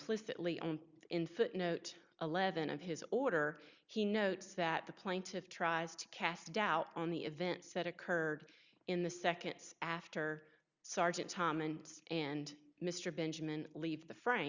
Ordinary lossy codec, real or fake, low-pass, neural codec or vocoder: Opus, 64 kbps; real; 7.2 kHz; none